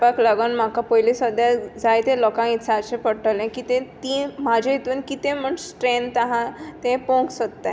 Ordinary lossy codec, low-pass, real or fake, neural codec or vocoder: none; none; real; none